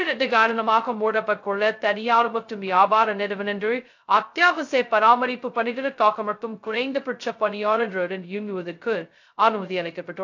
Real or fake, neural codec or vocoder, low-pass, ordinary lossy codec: fake; codec, 16 kHz, 0.2 kbps, FocalCodec; 7.2 kHz; AAC, 48 kbps